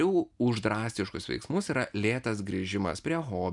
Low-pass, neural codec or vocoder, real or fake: 10.8 kHz; none; real